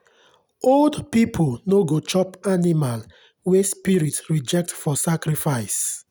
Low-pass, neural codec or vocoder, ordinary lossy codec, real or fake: none; none; none; real